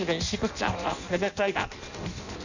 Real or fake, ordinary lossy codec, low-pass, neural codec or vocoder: fake; none; 7.2 kHz; codec, 16 kHz in and 24 kHz out, 0.6 kbps, FireRedTTS-2 codec